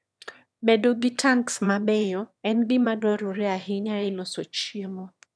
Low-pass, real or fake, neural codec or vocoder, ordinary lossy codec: none; fake; autoencoder, 22.05 kHz, a latent of 192 numbers a frame, VITS, trained on one speaker; none